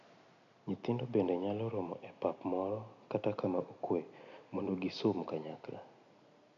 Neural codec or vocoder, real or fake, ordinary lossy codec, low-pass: none; real; none; 7.2 kHz